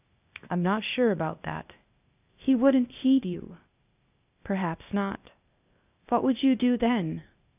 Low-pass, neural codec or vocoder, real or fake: 3.6 kHz; codec, 16 kHz, 0.8 kbps, ZipCodec; fake